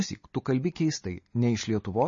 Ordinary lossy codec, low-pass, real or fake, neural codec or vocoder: MP3, 32 kbps; 7.2 kHz; real; none